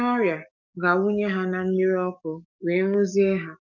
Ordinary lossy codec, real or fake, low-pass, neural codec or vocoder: none; fake; 7.2 kHz; codec, 44.1 kHz, 7.8 kbps, DAC